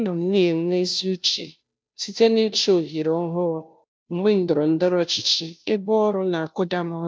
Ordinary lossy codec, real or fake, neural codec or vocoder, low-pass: none; fake; codec, 16 kHz, 0.5 kbps, FunCodec, trained on Chinese and English, 25 frames a second; none